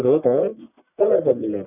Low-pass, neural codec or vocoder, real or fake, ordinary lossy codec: 3.6 kHz; codec, 44.1 kHz, 1.7 kbps, Pupu-Codec; fake; none